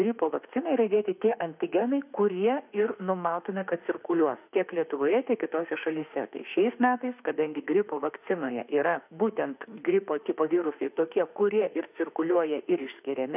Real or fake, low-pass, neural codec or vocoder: fake; 3.6 kHz; autoencoder, 48 kHz, 32 numbers a frame, DAC-VAE, trained on Japanese speech